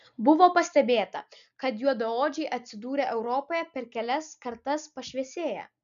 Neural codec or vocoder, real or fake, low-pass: none; real; 7.2 kHz